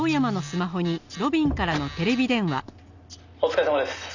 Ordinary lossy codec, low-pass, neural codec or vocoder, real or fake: none; 7.2 kHz; none; real